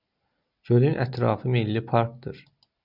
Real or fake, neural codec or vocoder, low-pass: real; none; 5.4 kHz